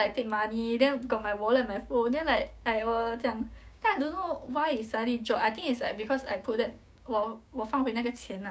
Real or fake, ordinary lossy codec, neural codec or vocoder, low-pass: fake; none; codec, 16 kHz, 6 kbps, DAC; none